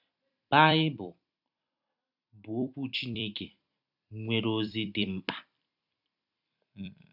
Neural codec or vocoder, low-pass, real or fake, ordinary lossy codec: vocoder, 44.1 kHz, 128 mel bands every 256 samples, BigVGAN v2; 5.4 kHz; fake; none